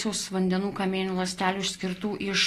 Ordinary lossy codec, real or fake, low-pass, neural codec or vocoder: AAC, 48 kbps; real; 14.4 kHz; none